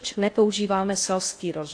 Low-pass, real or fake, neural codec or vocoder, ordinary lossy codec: 9.9 kHz; fake; codec, 16 kHz in and 24 kHz out, 0.6 kbps, FocalCodec, streaming, 4096 codes; AAC, 48 kbps